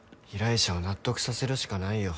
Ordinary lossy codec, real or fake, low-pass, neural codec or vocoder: none; real; none; none